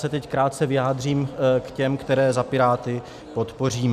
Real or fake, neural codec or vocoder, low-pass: real; none; 14.4 kHz